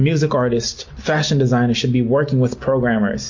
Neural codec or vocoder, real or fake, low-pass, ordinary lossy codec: none; real; 7.2 kHz; MP3, 48 kbps